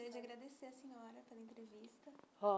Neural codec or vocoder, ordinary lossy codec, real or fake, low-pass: none; none; real; none